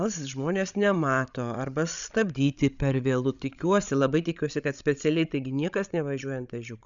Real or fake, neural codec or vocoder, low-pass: fake; codec, 16 kHz, 16 kbps, FreqCodec, larger model; 7.2 kHz